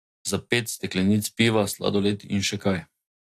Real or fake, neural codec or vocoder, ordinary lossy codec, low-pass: real; none; AAC, 64 kbps; 14.4 kHz